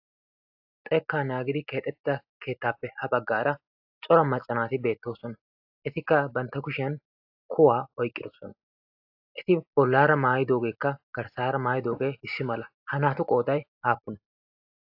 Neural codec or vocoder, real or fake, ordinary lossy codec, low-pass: none; real; AAC, 48 kbps; 5.4 kHz